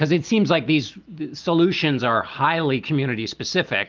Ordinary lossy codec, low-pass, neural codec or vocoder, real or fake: Opus, 24 kbps; 7.2 kHz; none; real